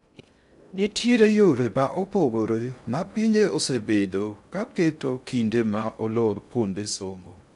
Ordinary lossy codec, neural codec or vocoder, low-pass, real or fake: none; codec, 16 kHz in and 24 kHz out, 0.6 kbps, FocalCodec, streaming, 2048 codes; 10.8 kHz; fake